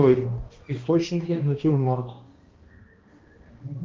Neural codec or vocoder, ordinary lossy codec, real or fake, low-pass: codec, 16 kHz, 1 kbps, X-Codec, HuBERT features, trained on balanced general audio; Opus, 32 kbps; fake; 7.2 kHz